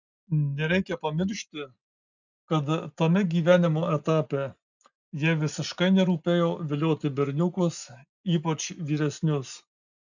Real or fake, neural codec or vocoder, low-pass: real; none; 7.2 kHz